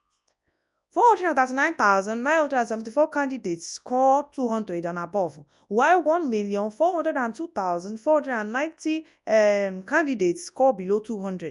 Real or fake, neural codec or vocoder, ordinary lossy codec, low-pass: fake; codec, 24 kHz, 0.9 kbps, WavTokenizer, large speech release; MP3, 96 kbps; 10.8 kHz